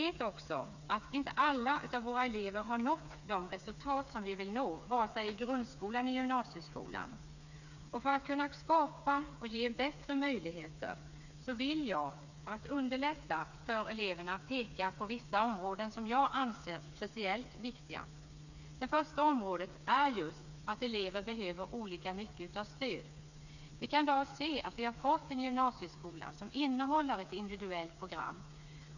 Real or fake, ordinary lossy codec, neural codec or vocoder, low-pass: fake; none; codec, 16 kHz, 4 kbps, FreqCodec, smaller model; 7.2 kHz